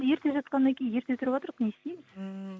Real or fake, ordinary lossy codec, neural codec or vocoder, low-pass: real; none; none; none